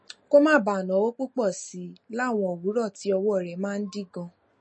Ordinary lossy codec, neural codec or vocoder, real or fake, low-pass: MP3, 32 kbps; none; real; 10.8 kHz